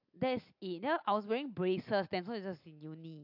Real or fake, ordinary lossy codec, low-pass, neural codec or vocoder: real; AAC, 48 kbps; 5.4 kHz; none